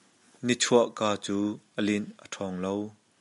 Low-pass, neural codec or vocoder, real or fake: 10.8 kHz; none; real